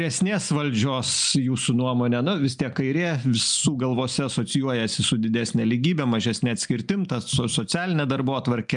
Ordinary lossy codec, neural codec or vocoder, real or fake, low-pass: MP3, 96 kbps; none; real; 9.9 kHz